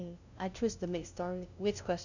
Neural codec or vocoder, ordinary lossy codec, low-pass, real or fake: codec, 16 kHz, 0.5 kbps, FunCodec, trained on LibriTTS, 25 frames a second; none; 7.2 kHz; fake